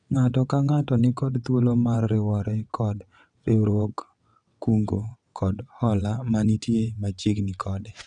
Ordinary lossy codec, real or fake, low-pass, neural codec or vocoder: none; fake; 9.9 kHz; vocoder, 22.05 kHz, 80 mel bands, WaveNeXt